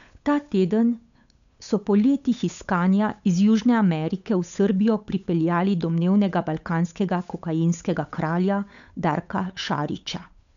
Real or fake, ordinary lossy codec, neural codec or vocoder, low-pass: fake; none; codec, 16 kHz, 8 kbps, FunCodec, trained on Chinese and English, 25 frames a second; 7.2 kHz